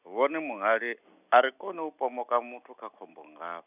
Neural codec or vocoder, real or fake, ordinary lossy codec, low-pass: none; real; none; 3.6 kHz